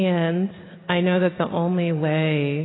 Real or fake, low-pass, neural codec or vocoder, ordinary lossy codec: real; 7.2 kHz; none; AAC, 16 kbps